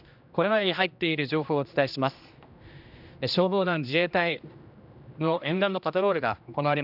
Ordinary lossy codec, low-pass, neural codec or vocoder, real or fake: none; 5.4 kHz; codec, 16 kHz, 1 kbps, X-Codec, HuBERT features, trained on general audio; fake